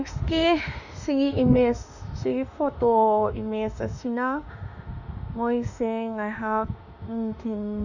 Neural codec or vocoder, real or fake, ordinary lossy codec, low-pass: autoencoder, 48 kHz, 32 numbers a frame, DAC-VAE, trained on Japanese speech; fake; none; 7.2 kHz